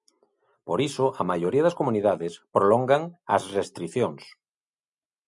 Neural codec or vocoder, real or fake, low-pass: none; real; 10.8 kHz